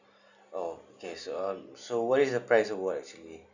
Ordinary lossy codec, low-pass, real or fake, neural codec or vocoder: none; 7.2 kHz; real; none